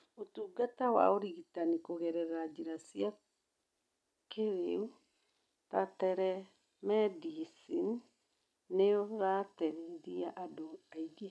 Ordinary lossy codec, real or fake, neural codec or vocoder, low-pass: none; real; none; none